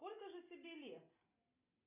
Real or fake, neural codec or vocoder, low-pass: real; none; 3.6 kHz